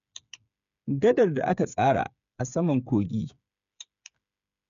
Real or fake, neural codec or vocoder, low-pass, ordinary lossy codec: fake; codec, 16 kHz, 8 kbps, FreqCodec, smaller model; 7.2 kHz; none